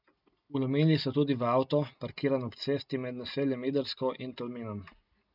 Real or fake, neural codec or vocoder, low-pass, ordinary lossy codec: real; none; 5.4 kHz; none